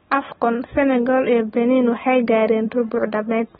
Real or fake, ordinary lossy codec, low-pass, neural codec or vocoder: real; AAC, 16 kbps; 10.8 kHz; none